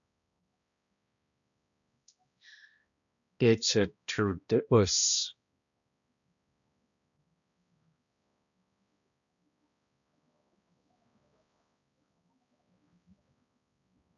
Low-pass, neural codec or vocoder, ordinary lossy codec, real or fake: 7.2 kHz; codec, 16 kHz, 1 kbps, X-Codec, HuBERT features, trained on balanced general audio; AAC, 64 kbps; fake